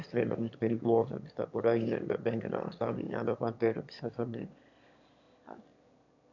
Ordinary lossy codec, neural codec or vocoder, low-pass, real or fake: none; autoencoder, 22.05 kHz, a latent of 192 numbers a frame, VITS, trained on one speaker; 7.2 kHz; fake